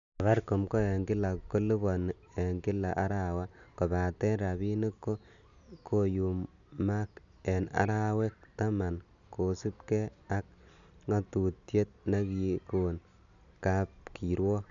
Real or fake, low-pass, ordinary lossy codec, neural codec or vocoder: real; 7.2 kHz; none; none